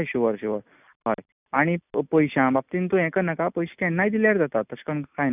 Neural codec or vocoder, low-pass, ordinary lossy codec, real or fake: none; 3.6 kHz; none; real